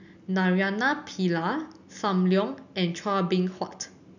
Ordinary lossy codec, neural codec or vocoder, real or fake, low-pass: none; none; real; 7.2 kHz